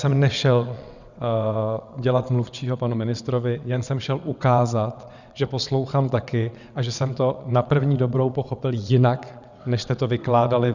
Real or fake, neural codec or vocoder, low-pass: fake; vocoder, 22.05 kHz, 80 mel bands, WaveNeXt; 7.2 kHz